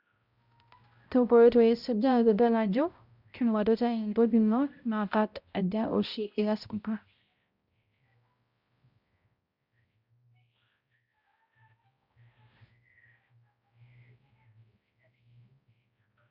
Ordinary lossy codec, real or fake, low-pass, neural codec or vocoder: Opus, 64 kbps; fake; 5.4 kHz; codec, 16 kHz, 0.5 kbps, X-Codec, HuBERT features, trained on balanced general audio